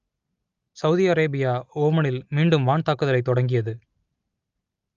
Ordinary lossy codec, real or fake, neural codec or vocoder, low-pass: Opus, 32 kbps; real; none; 7.2 kHz